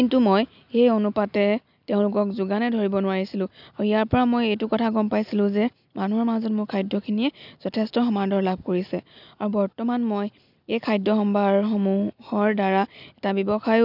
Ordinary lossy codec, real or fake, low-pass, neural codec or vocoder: none; real; 5.4 kHz; none